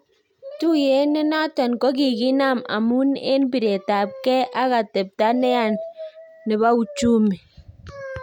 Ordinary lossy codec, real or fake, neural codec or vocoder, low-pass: none; real; none; 19.8 kHz